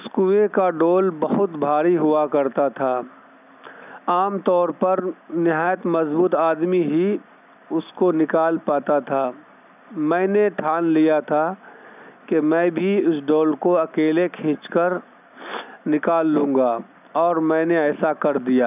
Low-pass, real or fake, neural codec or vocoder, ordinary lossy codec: 3.6 kHz; real; none; none